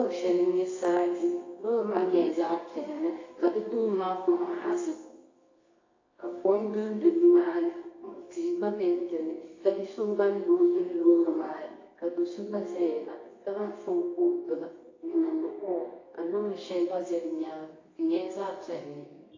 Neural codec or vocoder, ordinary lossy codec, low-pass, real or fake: codec, 24 kHz, 0.9 kbps, WavTokenizer, medium music audio release; AAC, 32 kbps; 7.2 kHz; fake